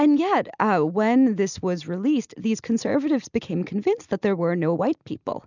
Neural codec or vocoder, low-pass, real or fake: none; 7.2 kHz; real